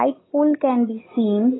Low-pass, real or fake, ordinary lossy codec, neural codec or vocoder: 7.2 kHz; real; AAC, 16 kbps; none